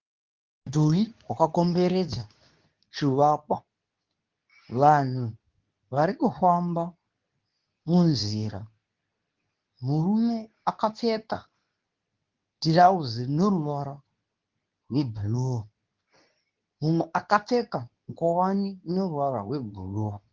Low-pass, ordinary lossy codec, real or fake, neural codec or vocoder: 7.2 kHz; Opus, 32 kbps; fake; codec, 24 kHz, 0.9 kbps, WavTokenizer, medium speech release version 2